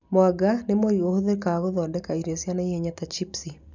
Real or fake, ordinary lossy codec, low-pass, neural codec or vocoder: real; none; 7.2 kHz; none